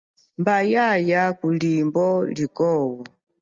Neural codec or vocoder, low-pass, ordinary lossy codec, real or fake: none; 7.2 kHz; Opus, 32 kbps; real